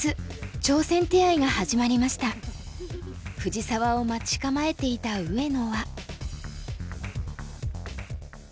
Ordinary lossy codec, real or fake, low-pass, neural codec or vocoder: none; real; none; none